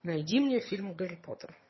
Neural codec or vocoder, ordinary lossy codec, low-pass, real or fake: vocoder, 22.05 kHz, 80 mel bands, HiFi-GAN; MP3, 24 kbps; 7.2 kHz; fake